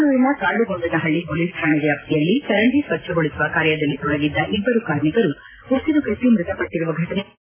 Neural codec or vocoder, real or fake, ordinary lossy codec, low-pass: none; real; AAC, 16 kbps; 3.6 kHz